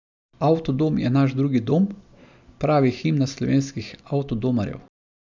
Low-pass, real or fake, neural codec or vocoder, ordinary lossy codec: 7.2 kHz; real; none; none